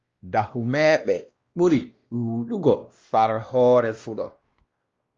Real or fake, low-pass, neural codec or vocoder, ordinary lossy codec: fake; 7.2 kHz; codec, 16 kHz, 1 kbps, X-Codec, WavLM features, trained on Multilingual LibriSpeech; Opus, 32 kbps